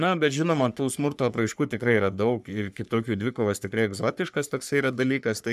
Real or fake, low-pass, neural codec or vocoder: fake; 14.4 kHz; codec, 44.1 kHz, 3.4 kbps, Pupu-Codec